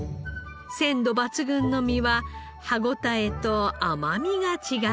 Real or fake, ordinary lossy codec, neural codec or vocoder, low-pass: real; none; none; none